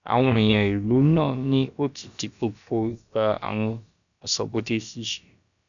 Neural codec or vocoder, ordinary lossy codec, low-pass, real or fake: codec, 16 kHz, about 1 kbps, DyCAST, with the encoder's durations; none; 7.2 kHz; fake